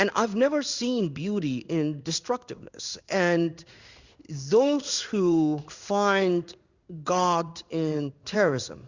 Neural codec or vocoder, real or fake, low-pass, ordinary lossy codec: codec, 16 kHz in and 24 kHz out, 1 kbps, XY-Tokenizer; fake; 7.2 kHz; Opus, 64 kbps